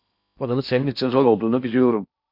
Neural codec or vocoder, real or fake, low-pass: codec, 16 kHz in and 24 kHz out, 0.8 kbps, FocalCodec, streaming, 65536 codes; fake; 5.4 kHz